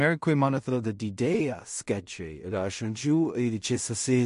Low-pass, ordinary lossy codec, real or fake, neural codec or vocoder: 10.8 kHz; MP3, 48 kbps; fake; codec, 16 kHz in and 24 kHz out, 0.4 kbps, LongCat-Audio-Codec, two codebook decoder